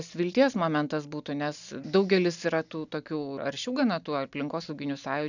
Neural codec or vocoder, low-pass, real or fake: none; 7.2 kHz; real